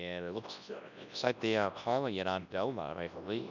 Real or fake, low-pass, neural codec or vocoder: fake; 7.2 kHz; codec, 24 kHz, 0.9 kbps, WavTokenizer, large speech release